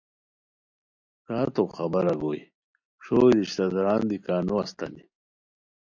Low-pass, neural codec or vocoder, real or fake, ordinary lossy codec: 7.2 kHz; none; real; MP3, 64 kbps